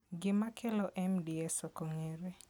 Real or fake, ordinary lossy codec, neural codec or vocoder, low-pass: real; none; none; none